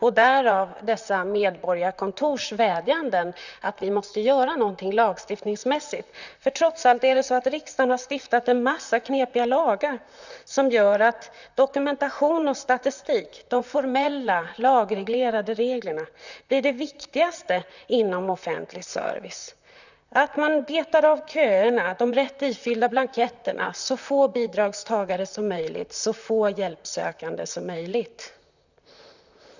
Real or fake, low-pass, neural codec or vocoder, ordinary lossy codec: fake; 7.2 kHz; vocoder, 44.1 kHz, 128 mel bands, Pupu-Vocoder; none